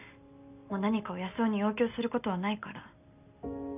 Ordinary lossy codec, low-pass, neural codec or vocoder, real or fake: none; 3.6 kHz; none; real